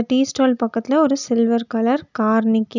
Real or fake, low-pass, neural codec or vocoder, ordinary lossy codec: real; 7.2 kHz; none; none